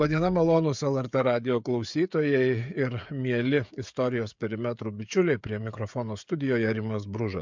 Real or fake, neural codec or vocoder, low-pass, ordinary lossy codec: fake; codec, 16 kHz, 16 kbps, FreqCodec, smaller model; 7.2 kHz; MP3, 64 kbps